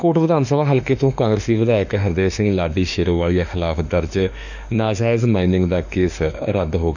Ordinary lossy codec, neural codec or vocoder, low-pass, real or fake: none; autoencoder, 48 kHz, 32 numbers a frame, DAC-VAE, trained on Japanese speech; 7.2 kHz; fake